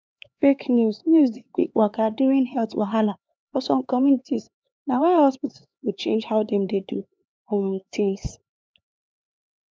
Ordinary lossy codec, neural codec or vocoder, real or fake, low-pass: none; codec, 16 kHz, 4 kbps, X-Codec, HuBERT features, trained on LibriSpeech; fake; none